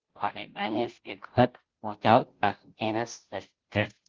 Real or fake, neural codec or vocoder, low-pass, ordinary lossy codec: fake; codec, 16 kHz, 0.5 kbps, FunCodec, trained on Chinese and English, 25 frames a second; 7.2 kHz; Opus, 16 kbps